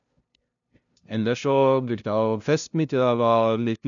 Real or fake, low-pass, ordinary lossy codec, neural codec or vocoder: fake; 7.2 kHz; none; codec, 16 kHz, 0.5 kbps, FunCodec, trained on LibriTTS, 25 frames a second